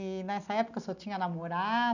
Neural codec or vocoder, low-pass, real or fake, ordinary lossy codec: none; 7.2 kHz; real; none